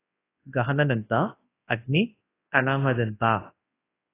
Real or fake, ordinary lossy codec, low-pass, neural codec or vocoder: fake; AAC, 16 kbps; 3.6 kHz; codec, 24 kHz, 0.9 kbps, WavTokenizer, large speech release